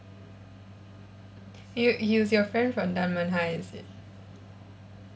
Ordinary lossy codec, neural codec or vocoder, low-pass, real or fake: none; none; none; real